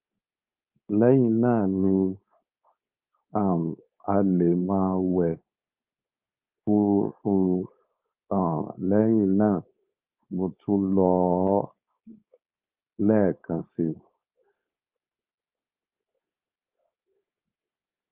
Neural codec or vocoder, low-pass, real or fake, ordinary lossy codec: codec, 16 kHz, 4.8 kbps, FACodec; 3.6 kHz; fake; Opus, 24 kbps